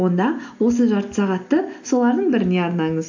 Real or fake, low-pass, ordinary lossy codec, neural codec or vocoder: real; 7.2 kHz; AAC, 48 kbps; none